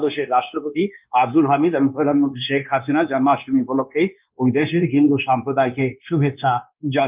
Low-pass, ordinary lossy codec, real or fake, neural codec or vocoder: 3.6 kHz; Opus, 24 kbps; fake; codec, 16 kHz, 0.9 kbps, LongCat-Audio-Codec